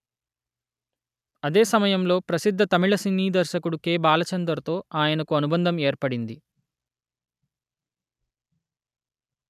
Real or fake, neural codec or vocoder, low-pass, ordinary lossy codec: real; none; 14.4 kHz; none